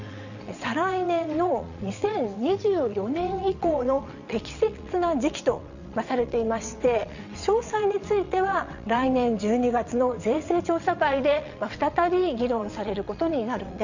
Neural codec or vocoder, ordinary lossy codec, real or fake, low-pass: vocoder, 22.05 kHz, 80 mel bands, WaveNeXt; none; fake; 7.2 kHz